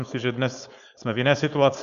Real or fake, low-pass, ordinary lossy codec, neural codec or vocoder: fake; 7.2 kHz; Opus, 64 kbps; codec, 16 kHz, 4.8 kbps, FACodec